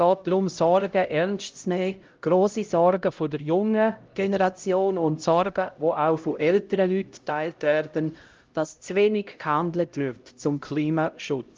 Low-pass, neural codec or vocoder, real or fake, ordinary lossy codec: 7.2 kHz; codec, 16 kHz, 0.5 kbps, X-Codec, HuBERT features, trained on LibriSpeech; fake; Opus, 24 kbps